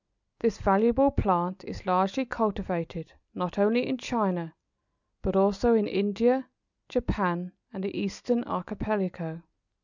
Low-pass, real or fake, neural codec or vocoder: 7.2 kHz; real; none